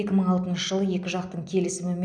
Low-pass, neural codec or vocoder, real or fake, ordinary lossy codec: 9.9 kHz; none; real; none